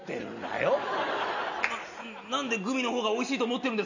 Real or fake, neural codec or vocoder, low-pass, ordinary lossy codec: real; none; 7.2 kHz; none